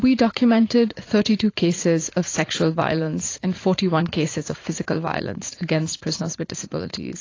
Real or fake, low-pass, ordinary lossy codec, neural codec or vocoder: fake; 7.2 kHz; AAC, 32 kbps; vocoder, 44.1 kHz, 80 mel bands, Vocos